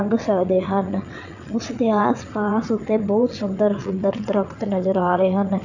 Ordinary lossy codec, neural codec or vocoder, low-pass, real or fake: none; vocoder, 22.05 kHz, 80 mel bands, WaveNeXt; 7.2 kHz; fake